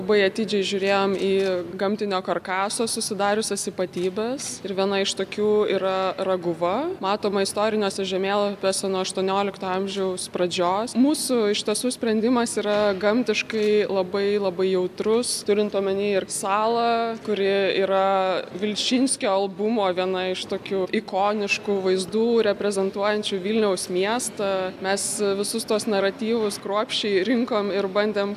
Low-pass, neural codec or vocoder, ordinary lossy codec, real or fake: 14.4 kHz; none; AAC, 96 kbps; real